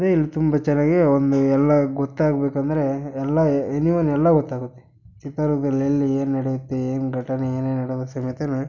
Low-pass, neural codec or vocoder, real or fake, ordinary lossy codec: 7.2 kHz; none; real; none